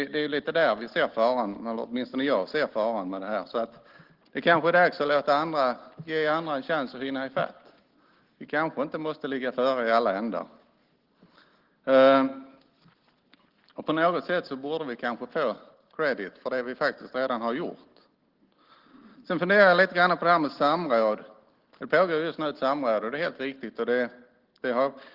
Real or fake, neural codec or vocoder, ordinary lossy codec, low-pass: real; none; Opus, 16 kbps; 5.4 kHz